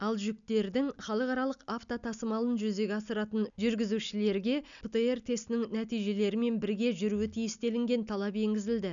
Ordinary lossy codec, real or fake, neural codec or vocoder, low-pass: none; real; none; 7.2 kHz